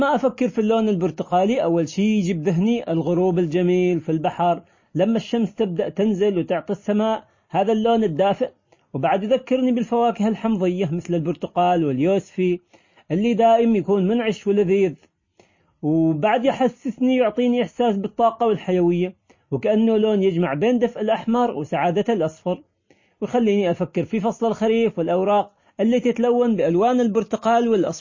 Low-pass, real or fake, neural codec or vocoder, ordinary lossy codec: 7.2 kHz; real; none; MP3, 32 kbps